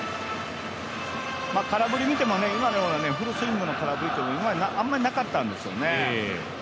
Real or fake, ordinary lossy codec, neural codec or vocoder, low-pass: real; none; none; none